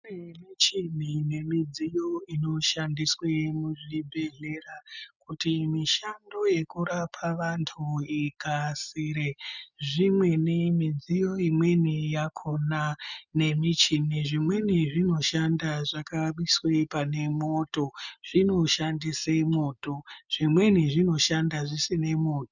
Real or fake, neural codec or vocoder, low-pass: real; none; 7.2 kHz